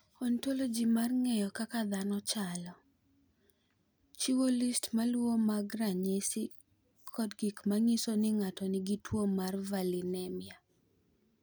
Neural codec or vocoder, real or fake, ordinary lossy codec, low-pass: none; real; none; none